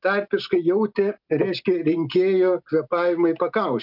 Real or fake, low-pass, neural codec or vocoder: real; 5.4 kHz; none